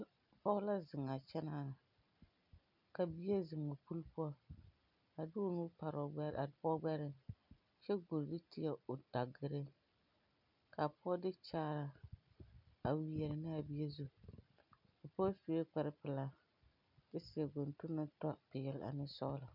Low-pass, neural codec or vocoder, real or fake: 5.4 kHz; none; real